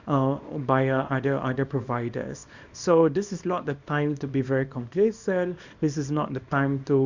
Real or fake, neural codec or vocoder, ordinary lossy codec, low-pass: fake; codec, 24 kHz, 0.9 kbps, WavTokenizer, small release; Opus, 64 kbps; 7.2 kHz